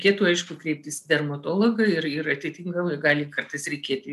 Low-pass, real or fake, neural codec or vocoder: 14.4 kHz; real; none